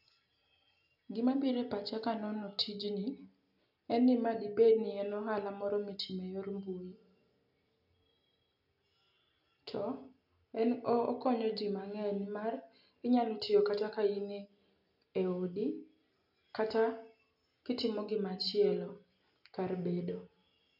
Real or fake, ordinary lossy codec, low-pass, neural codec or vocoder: real; none; 5.4 kHz; none